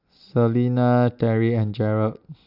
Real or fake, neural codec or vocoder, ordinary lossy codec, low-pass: real; none; AAC, 48 kbps; 5.4 kHz